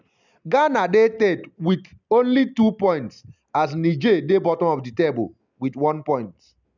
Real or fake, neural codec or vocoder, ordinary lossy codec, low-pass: real; none; none; 7.2 kHz